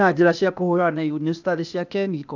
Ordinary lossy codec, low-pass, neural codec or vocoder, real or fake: none; 7.2 kHz; codec, 16 kHz, 0.8 kbps, ZipCodec; fake